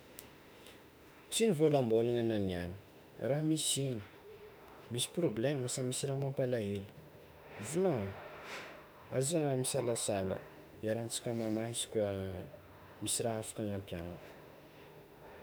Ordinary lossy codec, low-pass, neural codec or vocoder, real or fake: none; none; autoencoder, 48 kHz, 32 numbers a frame, DAC-VAE, trained on Japanese speech; fake